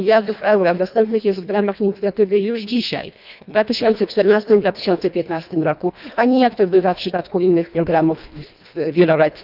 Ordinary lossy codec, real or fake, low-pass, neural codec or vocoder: none; fake; 5.4 kHz; codec, 24 kHz, 1.5 kbps, HILCodec